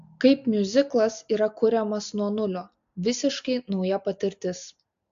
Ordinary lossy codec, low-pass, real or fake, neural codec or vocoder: AAC, 64 kbps; 7.2 kHz; real; none